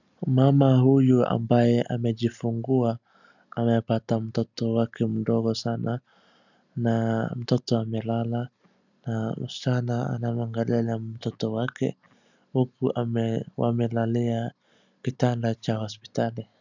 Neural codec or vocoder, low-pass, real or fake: none; 7.2 kHz; real